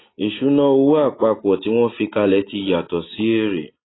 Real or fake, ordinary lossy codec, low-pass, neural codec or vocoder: real; AAC, 16 kbps; 7.2 kHz; none